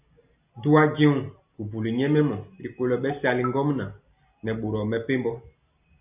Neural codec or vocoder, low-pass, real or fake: none; 3.6 kHz; real